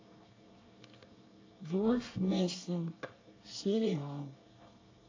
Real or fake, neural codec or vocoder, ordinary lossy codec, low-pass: fake; codec, 24 kHz, 1 kbps, SNAC; AAC, 32 kbps; 7.2 kHz